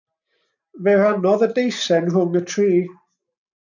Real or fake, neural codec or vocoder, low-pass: real; none; 7.2 kHz